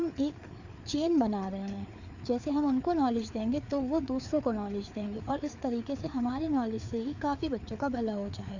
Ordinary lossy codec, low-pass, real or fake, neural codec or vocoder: none; 7.2 kHz; fake; codec, 16 kHz, 4 kbps, FreqCodec, larger model